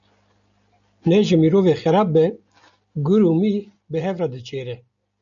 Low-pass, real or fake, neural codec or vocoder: 7.2 kHz; real; none